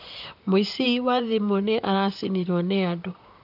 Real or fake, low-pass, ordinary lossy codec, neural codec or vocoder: fake; 5.4 kHz; none; vocoder, 44.1 kHz, 128 mel bands, Pupu-Vocoder